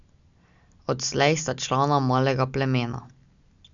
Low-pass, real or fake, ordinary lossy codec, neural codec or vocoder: 7.2 kHz; real; none; none